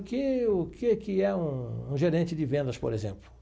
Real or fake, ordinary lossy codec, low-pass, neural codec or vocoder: real; none; none; none